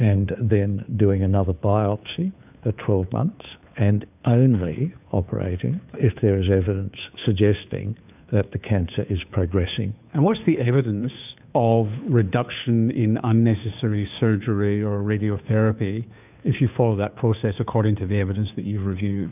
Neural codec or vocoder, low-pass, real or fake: codec, 16 kHz, 2 kbps, FunCodec, trained on Chinese and English, 25 frames a second; 3.6 kHz; fake